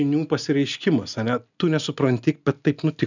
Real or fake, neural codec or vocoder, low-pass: real; none; 7.2 kHz